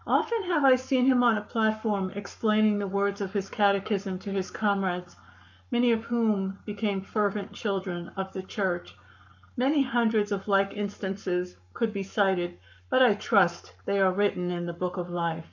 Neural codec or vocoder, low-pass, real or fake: codec, 44.1 kHz, 7.8 kbps, Pupu-Codec; 7.2 kHz; fake